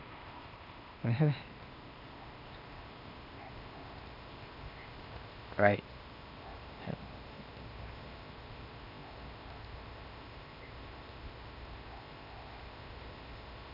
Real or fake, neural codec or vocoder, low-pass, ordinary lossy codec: fake; codec, 16 kHz, 0.8 kbps, ZipCodec; 5.4 kHz; none